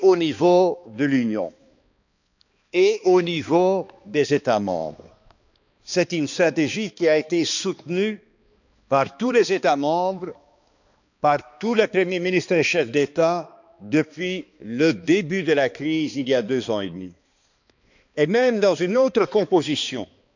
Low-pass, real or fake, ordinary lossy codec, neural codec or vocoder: 7.2 kHz; fake; none; codec, 16 kHz, 2 kbps, X-Codec, HuBERT features, trained on balanced general audio